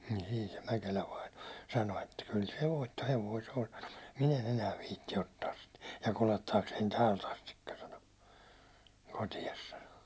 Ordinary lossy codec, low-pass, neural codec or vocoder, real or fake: none; none; none; real